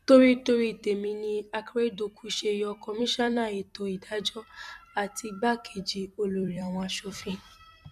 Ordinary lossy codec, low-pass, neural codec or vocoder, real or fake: none; 14.4 kHz; vocoder, 44.1 kHz, 128 mel bands every 256 samples, BigVGAN v2; fake